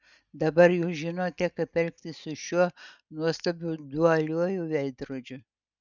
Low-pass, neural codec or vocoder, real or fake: 7.2 kHz; none; real